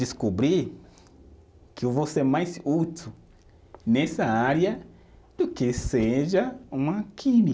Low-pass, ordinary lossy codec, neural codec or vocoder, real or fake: none; none; none; real